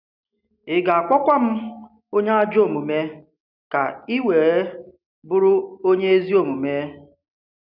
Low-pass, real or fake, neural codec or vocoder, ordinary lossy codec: 5.4 kHz; real; none; none